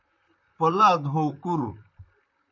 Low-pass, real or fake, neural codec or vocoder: 7.2 kHz; fake; vocoder, 44.1 kHz, 128 mel bands, Pupu-Vocoder